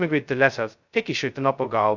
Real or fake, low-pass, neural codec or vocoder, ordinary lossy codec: fake; 7.2 kHz; codec, 16 kHz, 0.2 kbps, FocalCodec; Opus, 64 kbps